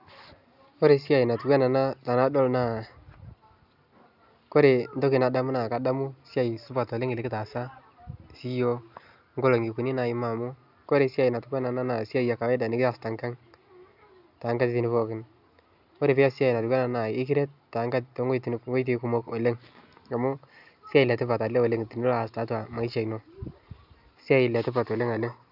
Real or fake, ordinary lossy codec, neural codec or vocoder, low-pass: real; none; none; 5.4 kHz